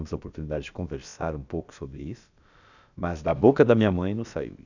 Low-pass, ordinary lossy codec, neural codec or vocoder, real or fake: 7.2 kHz; none; codec, 16 kHz, about 1 kbps, DyCAST, with the encoder's durations; fake